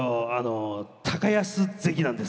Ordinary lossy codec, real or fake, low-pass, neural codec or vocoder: none; real; none; none